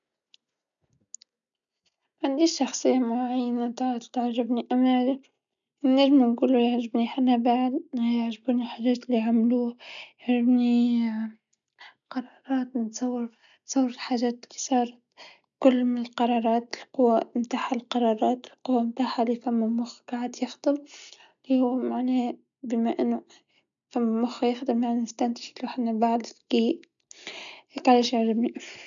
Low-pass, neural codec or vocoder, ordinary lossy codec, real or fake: 7.2 kHz; none; none; real